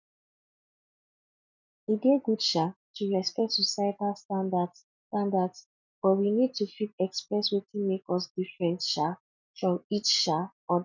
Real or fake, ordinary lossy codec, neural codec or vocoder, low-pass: real; none; none; 7.2 kHz